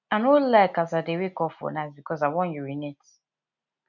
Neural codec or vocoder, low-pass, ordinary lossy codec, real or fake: none; 7.2 kHz; none; real